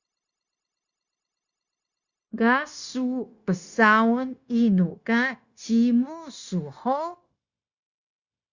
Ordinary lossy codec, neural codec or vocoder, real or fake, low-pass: Opus, 64 kbps; codec, 16 kHz, 0.9 kbps, LongCat-Audio-Codec; fake; 7.2 kHz